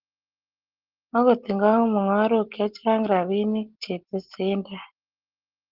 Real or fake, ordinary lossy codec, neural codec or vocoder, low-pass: real; Opus, 16 kbps; none; 5.4 kHz